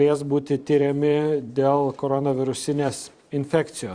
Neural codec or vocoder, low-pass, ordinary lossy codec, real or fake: vocoder, 44.1 kHz, 128 mel bands every 512 samples, BigVGAN v2; 9.9 kHz; Opus, 64 kbps; fake